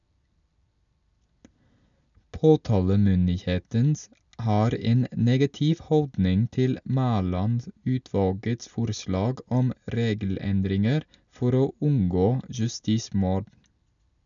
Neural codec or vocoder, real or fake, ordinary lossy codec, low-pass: none; real; none; 7.2 kHz